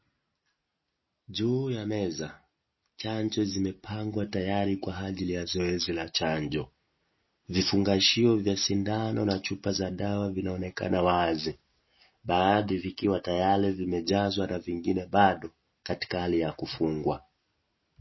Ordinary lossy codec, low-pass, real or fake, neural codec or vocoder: MP3, 24 kbps; 7.2 kHz; real; none